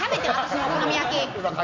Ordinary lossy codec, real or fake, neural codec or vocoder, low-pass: MP3, 48 kbps; real; none; 7.2 kHz